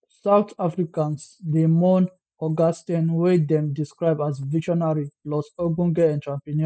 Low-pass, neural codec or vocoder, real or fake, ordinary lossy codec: none; none; real; none